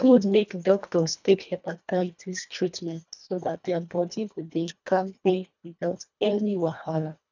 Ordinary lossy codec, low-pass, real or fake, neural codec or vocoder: none; 7.2 kHz; fake; codec, 24 kHz, 1.5 kbps, HILCodec